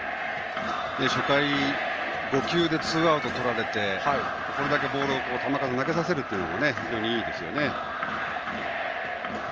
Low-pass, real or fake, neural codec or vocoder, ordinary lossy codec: 7.2 kHz; real; none; Opus, 24 kbps